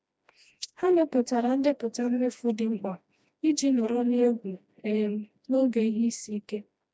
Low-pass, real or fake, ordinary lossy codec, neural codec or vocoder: none; fake; none; codec, 16 kHz, 1 kbps, FreqCodec, smaller model